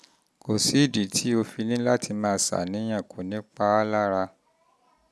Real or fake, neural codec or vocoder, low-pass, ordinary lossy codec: real; none; none; none